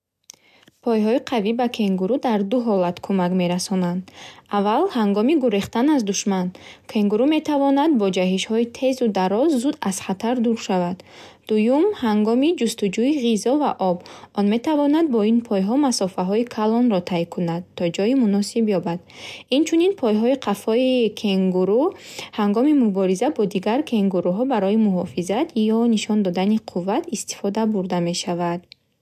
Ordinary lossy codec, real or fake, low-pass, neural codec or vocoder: MP3, 96 kbps; real; 14.4 kHz; none